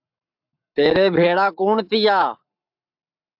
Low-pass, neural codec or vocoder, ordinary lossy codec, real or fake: 5.4 kHz; codec, 44.1 kHz, 7.8 kbps, Pupu-Codec; AAC, 48 kbps; fake